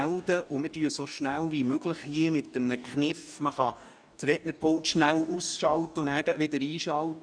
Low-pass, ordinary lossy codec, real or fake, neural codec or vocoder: 9.9 kHz; none; fake; codec, 44.1 kHz, 2.6 kbps, DAC